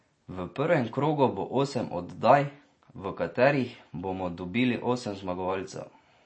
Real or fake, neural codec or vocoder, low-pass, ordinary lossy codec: real; none; 9.9 kHz; MP3, 32 kbps